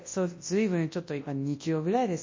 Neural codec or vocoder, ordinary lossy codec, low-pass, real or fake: codec, 24 kHz, 0.9 kbps, WavTokenizer, large speech release; MP3, 32 kbps; 7.2 kHz; fake